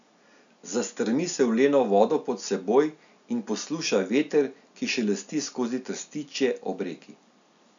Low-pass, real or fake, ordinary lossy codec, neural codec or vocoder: 7.2 kHz; real; none; none